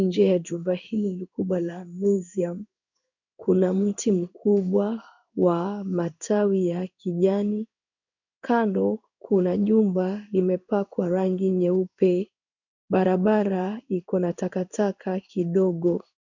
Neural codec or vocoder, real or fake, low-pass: codec, 16 kHz in and 24 kHz out, 1 kbps, XY-Tokenizer; fake; 7.2 kHz